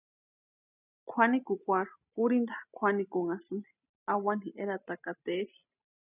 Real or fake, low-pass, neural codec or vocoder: real; 3.6 kHz; none